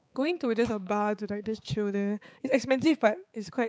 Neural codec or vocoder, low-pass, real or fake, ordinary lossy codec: codec, 16 kHz, 4 kbps, X-Codec, HuBERT features, trained on balanced general audio; none; fake; none